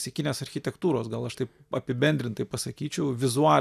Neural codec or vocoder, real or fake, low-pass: none; real; 14.4 kHz